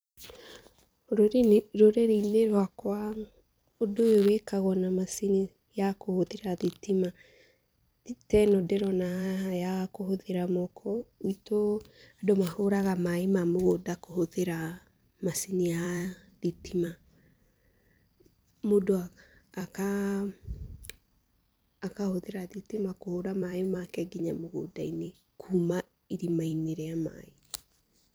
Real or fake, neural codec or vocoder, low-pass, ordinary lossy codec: fake; vocoder, 44.1 kHz, 128 mel bands every 256 samples, BigVGAN v2; none; none